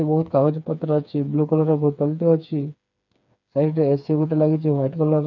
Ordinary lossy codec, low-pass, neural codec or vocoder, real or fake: none; 7.2 kHz; codec, 16 kHz, 4 kbps, FreqCodec, smaller model; fake